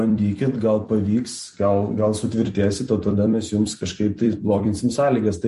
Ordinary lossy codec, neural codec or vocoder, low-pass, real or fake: MP3, 48 kbps; vocoder, 44.1 kHz, 128 mel bands every 256 samples, BigVGAN v2; 14.4 kHz; fake